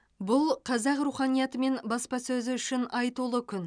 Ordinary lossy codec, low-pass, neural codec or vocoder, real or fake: none; 9.9 kHz; none; real